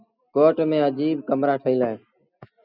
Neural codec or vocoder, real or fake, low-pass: none; real; 5.4 kHz